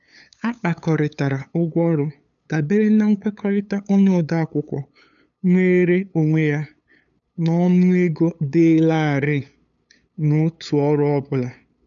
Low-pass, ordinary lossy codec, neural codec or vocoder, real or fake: 7.2 kHz; none; codec, 16 kHz, 8 kbps, FunCodec, trained on LibriTTS, 25 frames a second; fake